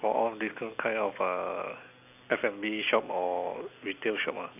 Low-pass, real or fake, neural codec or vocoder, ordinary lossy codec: 3.6 kHz; real; none; none